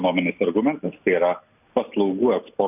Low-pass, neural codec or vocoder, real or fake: 3.6 kHz; none; real